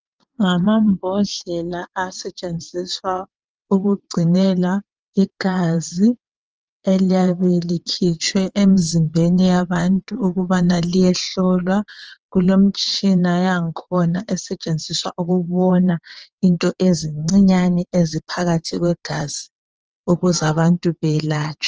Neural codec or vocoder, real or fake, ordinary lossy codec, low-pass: vocoder, 22.05 kHz, 80 mel bands, WaveNeXt; fake; Opus, 24 kbps; 7.2 kHz